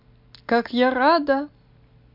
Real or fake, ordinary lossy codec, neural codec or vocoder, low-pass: real; MP3, 48 kbps; none; 5.4 kHz